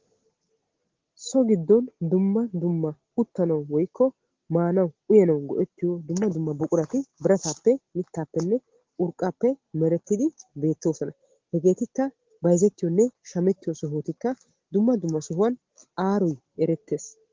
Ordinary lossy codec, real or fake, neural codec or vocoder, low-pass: Opus, 16 kbps; real; none; 7.2 kHz